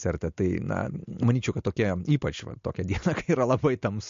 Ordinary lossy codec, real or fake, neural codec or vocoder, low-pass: MP3, 48 kbps; real; none; 7.2 kHz